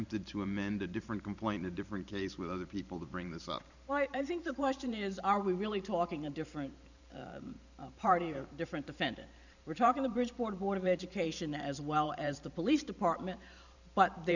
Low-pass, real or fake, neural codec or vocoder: 7.2 kHz; fake; vocoder, 44.1 kHz, 128 mel bands every 512 samples, BigVGAN v2